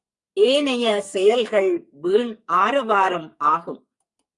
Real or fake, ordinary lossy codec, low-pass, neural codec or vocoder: fake; Opus, 64 kbps; 10.8 kHz; codec, 44.1 kHz, 2.6 kbps, SNAC